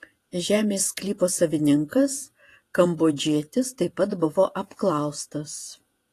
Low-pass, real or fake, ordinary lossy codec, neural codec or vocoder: 14.4 kHz; fake; AAC, 48 kbps; vocoder, 48 kHz, 128 mel bands, Vocos